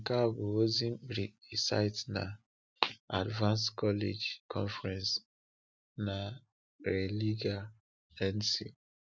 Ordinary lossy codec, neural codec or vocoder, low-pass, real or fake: none; none; none; real